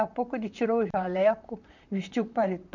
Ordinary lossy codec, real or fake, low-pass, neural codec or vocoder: none; fake; 7.2 kHz; vocoder, 44.1 kHz, 128 mel bands, Pupu-Vocoder